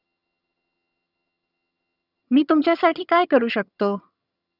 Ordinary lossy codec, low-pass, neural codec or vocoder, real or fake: none; 5.4 kHz; vocoder, 22.05 kHz, 80 mel bands, HiFi-GAN; fake